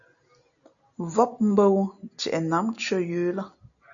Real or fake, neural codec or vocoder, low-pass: real; none; 7.2 kHz